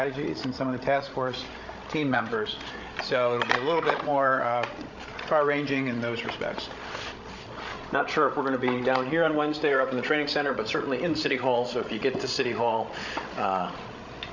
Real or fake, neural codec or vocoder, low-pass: fake; codec, 16 kHz, 16 kbps, FreqCodec, larger model; 7.2 kHz